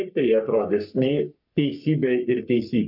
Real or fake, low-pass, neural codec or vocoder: fake; 5.4 kHz; codec, 44.1 kHz, 3.4 kbps, Pupu-Codec